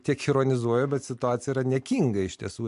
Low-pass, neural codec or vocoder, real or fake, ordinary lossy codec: 10.8 kHz; none; real; MP3, 64 kbps